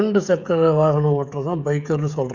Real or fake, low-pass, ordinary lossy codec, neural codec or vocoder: fake; 7.2 kHz; none; codec, 16 kHz, 16 kbps, FreqCodec, smaller model